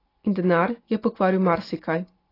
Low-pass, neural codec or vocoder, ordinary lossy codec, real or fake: 5.4 kHz; none; AAC, 24 kbps; real